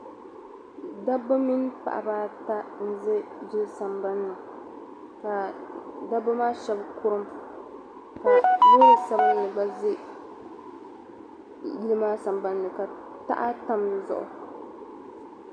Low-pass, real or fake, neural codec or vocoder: 9.9 kHz; real; none